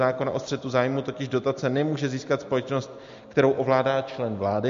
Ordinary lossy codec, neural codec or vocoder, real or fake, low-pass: MP3, 48 kbps; none; real; 7.2 kHz